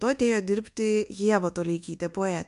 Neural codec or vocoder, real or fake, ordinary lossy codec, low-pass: codec, 24 kHz, 1.2 kbps, DualCodec; fake; MP3, 64 kbps; 10.8 kHz